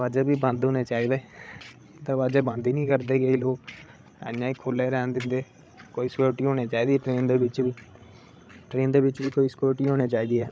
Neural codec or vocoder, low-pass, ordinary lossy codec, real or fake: codec, 16 kHz, 16 kbps, FreqCodec, larger model; none; none; fake